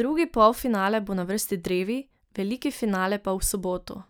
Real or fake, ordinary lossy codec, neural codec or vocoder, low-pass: real; none; none; none